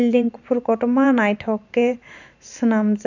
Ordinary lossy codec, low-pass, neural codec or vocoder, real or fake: none; 7.2 kHz; vocoder, 44.1 kHz, 128 mel bands every 512 samples, BigVGAN v2; fake